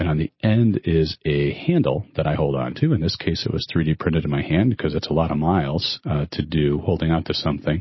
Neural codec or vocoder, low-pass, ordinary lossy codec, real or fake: none; 7.2 kHz; MP3, 24 kbps; real